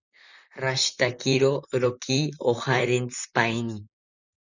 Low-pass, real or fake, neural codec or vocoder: 7.2 kHz; fake; vocoder, 44.1 kHz, 128 mel bands, Pupu-Vocoder